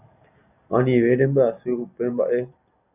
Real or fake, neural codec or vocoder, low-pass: real; none; 3.6 kHz